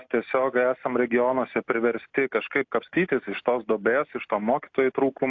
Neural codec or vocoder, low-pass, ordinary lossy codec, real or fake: none; 7.2 kHz; AAC, 48 kbps; real